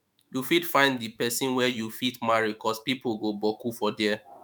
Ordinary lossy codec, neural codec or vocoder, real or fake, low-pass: none; autoencoder, 48 kHz, 128 numbers a frame, DAC-VAE, trained on Japanese speech; fake; none